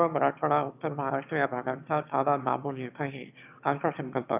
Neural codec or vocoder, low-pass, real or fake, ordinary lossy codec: autoencoder, 22.05 kHz, a latent of 192 numbers a frame, VITS, trained on one speaker; 3.6 kHz; fake; none